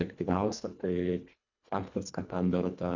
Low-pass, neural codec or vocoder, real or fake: 7.2 kHz; codec, 24 kHz, 1.5 kbps, HILCodec; fake